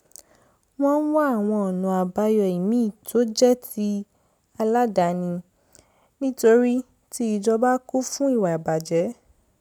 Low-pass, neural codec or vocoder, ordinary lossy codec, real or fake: none; none; none; real